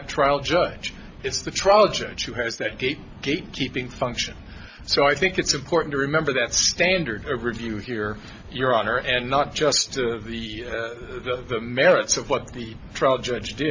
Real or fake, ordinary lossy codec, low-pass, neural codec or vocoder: real; AAC, 48 kbps; 7.2 kHz; none